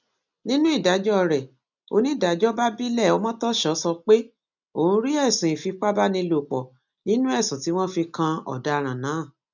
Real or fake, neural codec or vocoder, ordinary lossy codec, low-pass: real; none; none; 7.2 kHz